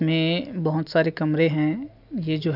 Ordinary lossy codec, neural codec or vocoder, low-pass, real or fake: none; none; 5.4 kHz; real